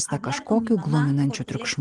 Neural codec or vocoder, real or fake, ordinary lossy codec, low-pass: none; real; Opus, 24 kbps; 10.8 kHz